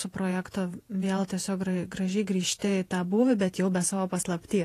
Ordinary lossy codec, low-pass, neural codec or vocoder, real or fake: AAC, 48 kbps; 14.4 kHz; vocoder, 48 kHz, 128 mel bands, Vocos; fake